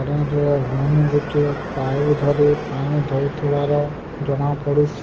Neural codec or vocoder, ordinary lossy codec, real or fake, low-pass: none; Opus, 16 kbps; real; 7.2 kHz